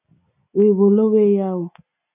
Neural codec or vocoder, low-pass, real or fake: none; 3.6 kHz; real